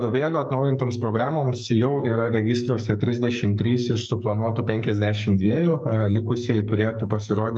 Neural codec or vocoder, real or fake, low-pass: codec, 44.1 kHz, 2.6 kbps, SNAC; fake; 9.9 kHz